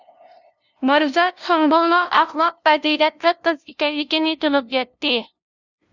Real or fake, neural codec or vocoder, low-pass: fake; codec, 16 kHz, 0.5 kbps, FunCodec, trained on LibriTTS, 25 frames a second; 7.2 kHz